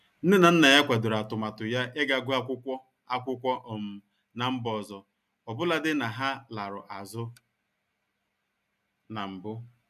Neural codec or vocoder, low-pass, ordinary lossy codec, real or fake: none; 14.4 kHz; none; real